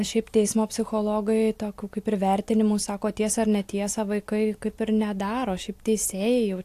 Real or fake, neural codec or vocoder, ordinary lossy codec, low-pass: real; none; AAC, 64 kbps; 14.4 kHz